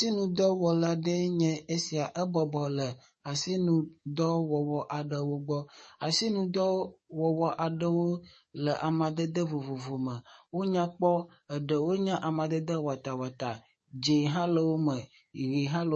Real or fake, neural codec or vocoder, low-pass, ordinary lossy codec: fake; codec, 44.1 kHz, 7.8 kbps, DAC; 10.8 kHz; MP3, 32 kbps